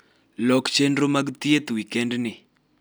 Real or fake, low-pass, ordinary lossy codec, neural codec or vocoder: real; none; none; none